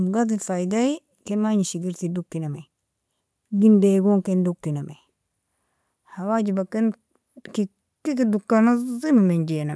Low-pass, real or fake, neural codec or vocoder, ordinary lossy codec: none; real; none; none